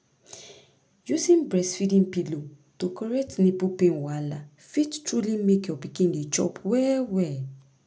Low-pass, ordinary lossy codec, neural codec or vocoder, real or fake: none; none; none; real